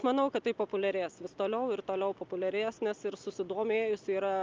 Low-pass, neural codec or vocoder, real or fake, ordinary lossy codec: 7.2 kHz; none; real; Opus, 24 kbps